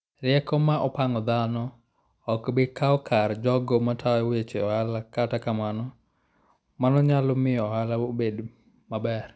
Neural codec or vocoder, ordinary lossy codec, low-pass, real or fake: none; none; none; real